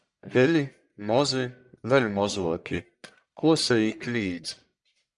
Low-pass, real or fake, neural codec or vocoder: 10.8 kHz; fake; codec, 44.1 kHz, 1.7 kbps, Pupu-Codec